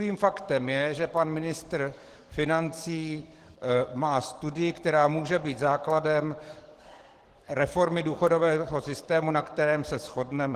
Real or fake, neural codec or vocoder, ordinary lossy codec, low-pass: fake; codec, 44.1 kHz, 7.8 kbps, DAC; Opus, 16 kbps; 14.4 kHz